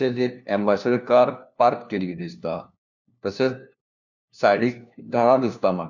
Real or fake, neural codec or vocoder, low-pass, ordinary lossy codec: fake; codec, 16 kHz, 1 kbps, FunCodec, trained on LibriTTS, 50 frames a second; 7.2 kHz; none